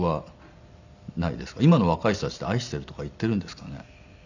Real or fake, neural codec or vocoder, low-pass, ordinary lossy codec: real; none; 7.2 kHz; none